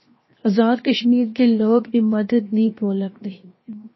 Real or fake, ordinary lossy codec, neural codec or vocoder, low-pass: fake; MP3, 24 kbps; codec, 16 kHz, 0.7 kbps, FocalCodec; 7.2 kHz